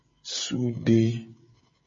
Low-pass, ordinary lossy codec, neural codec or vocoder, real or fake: 7.2 kHz; MP3, 32 kbps; codec, 16 kHz, 16 kbps, FreqCodec, smaller model; fake